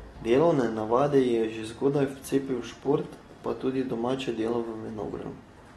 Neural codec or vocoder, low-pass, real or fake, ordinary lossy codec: none; 19.8 kHz; real; AAC, 32 kbps